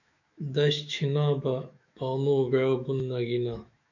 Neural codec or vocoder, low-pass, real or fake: autoencoder, 48 kHz, 128 numbers a frame, DAC-VAE, trained on Japanese speech; 7.2 kHz; fake